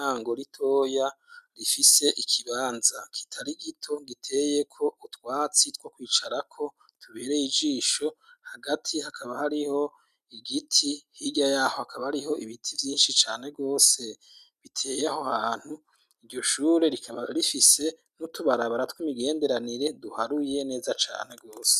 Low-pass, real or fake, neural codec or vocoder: 14.4 kHz; real; none